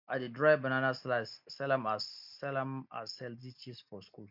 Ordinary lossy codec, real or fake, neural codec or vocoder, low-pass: MP3, 48 kbps; real; none; 5.4 kHz